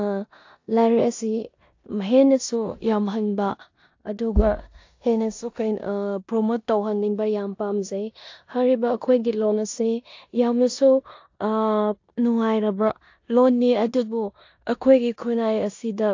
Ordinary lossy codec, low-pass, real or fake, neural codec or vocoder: AAC, 48 kbps; 7.2 kHz; fake; codec, 16 kHz in and 24 kHz out, 0.9 kbps, LongCat-Audio-Codec, four codebook decoder